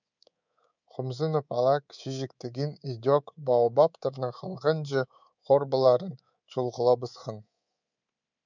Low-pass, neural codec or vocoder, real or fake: 7.2 kHz; codec, 24 kHz, 3.1 kbps, DualCodec; fake